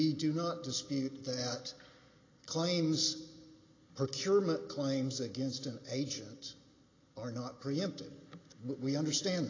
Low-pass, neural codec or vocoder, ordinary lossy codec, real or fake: 7.2 kHz; none; AAC, 32 kbps; real